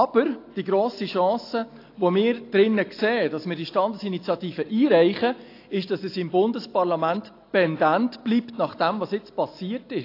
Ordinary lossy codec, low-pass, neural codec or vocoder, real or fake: AAC, 32 kbps; 5.4 kHz; none; real